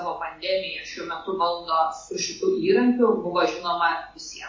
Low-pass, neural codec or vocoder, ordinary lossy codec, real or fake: 7.2 kHz; none; MP3, 32 kbps; real